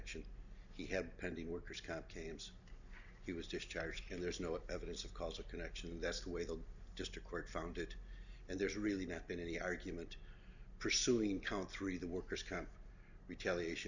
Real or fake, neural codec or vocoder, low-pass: real; none; 7.2 kHz